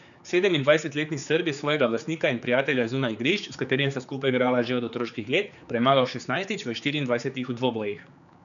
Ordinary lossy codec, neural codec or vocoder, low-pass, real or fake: none; codec, 16 kHz, 4 kbps, X-Codec, HuBERT features, trained on general audio; 7.2 kHz; fake